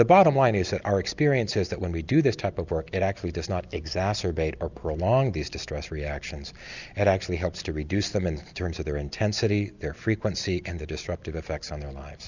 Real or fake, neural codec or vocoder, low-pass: real; none; 7.2 kHz